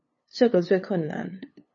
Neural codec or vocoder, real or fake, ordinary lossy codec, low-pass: codec, 16 kHz, 2 kbps, FunCodec, trained on LibriTTS, 25 frames a second; fake; MP3, 32 kbps; 7.2 kHz